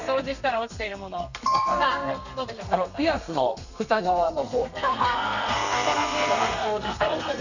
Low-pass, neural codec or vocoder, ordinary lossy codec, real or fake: 7.2 kHz; codec, 32 kHz, 1.9 kbps, SNAC; none; fake